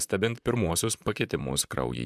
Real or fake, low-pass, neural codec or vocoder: fake; 14.4 kHz; vocoder, 44.1 kHz, 128 mel bands, Pupu-Vocoder